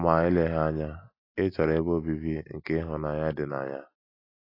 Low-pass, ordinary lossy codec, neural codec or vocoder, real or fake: 5.4 kHz; AAC, 24 kbps; none; real